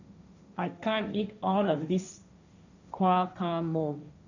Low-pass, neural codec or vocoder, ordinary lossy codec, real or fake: 7.2 kHz; codec, 16 kHz, 1.1 kbps, Voila-Tokenizer; none; fake